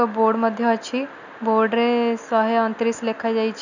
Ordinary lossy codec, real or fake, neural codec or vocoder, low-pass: none; real; none; 7.2 kHz